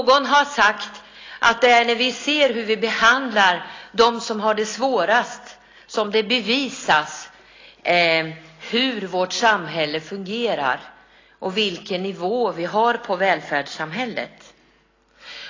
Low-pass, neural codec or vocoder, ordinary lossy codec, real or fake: 7.2 kHz; none; AAC, 32 kbps; real